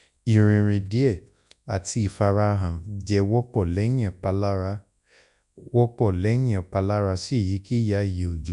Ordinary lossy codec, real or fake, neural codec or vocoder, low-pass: none; fake; codec, 24 kHz, 0.9 kbps, WavTokenizer, large speech release; 10.8 kHz